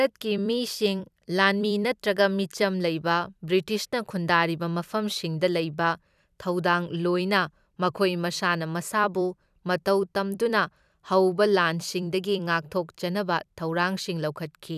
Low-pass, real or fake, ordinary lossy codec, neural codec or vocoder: 14.4 kHz; fake; none; vocoder, 44.1 kHz, 128 mel bands every 256 samples, BigVGAN v2